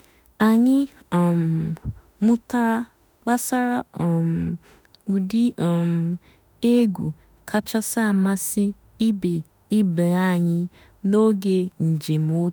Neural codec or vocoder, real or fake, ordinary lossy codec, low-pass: autoencoder, 48 kHz, 32 numbers a frame, DAC-VAE, trained on Japanese speech; fake; none; none